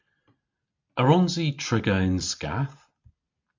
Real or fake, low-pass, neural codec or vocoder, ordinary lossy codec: real; 7.2 kHz; none; MP3, 48 kbps